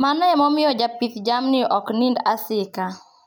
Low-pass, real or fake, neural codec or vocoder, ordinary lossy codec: none; real; none; none